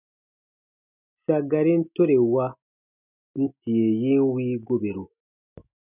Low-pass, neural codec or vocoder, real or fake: 3.6 kHz; none; real